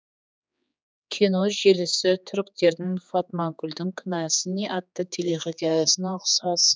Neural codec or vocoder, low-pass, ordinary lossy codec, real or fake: codec, 16 kHz, 4 kbps, X-Codec, HuBERT features, trained on general audio; none; none; fake